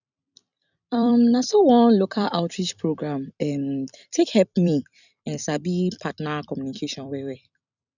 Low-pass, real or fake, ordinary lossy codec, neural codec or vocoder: 7.2 kHz; fake; none; vocoder, 44.1 kHz, 128 mel bands every 256 samples, BigVGAN v2